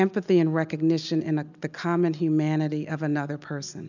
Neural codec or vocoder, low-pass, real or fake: none; 7.2 kHz; real